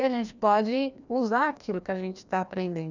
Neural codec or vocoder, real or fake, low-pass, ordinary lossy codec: codec, 16 kHz, 1 kbps, FreqCodec, larger model; fake; 7.2 kHz; none